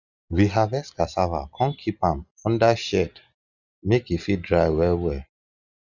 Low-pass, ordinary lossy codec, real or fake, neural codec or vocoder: 7.2 kHz; none; real; none